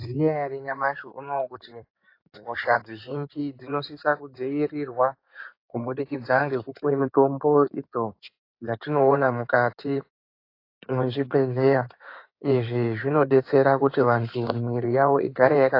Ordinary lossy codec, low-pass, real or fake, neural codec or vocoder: AAC, 32 kbps; 5.4 kHz; fake; codec, 16 kHz in and 24 kHz out, 2.2 kbps, FireRedTTS-2 codec